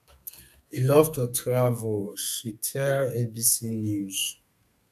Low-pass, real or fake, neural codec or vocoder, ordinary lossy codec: 14.4 kHz; fake; codec, 32 kHz, 1.9 kbps, SNAC; none